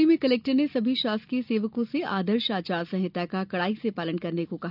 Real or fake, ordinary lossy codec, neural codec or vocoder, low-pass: real; none; none; 5.4 kHz